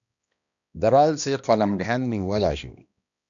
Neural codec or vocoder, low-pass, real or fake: codec, 16 kHz, 1 kbps, X-Codec, HuBERT features, trained on balanced general audio; 7.2 kHz; fake